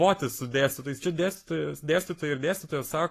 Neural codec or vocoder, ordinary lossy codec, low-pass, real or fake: codec, 44.1 kHz, 7.8 kbps, Pupu-Codec; AAC, 48 kbps; 14.4 kHz; fake